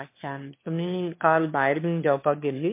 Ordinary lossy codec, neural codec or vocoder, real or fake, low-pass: MP3, 24 kbps; autoencoder, 22.05 kHz, a latent of 192 numbers a frame, VITS, trained on one speaker; fake; 3.6 kHz